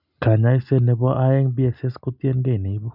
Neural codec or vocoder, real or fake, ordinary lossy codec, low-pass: none; real; none; 5.4 kHz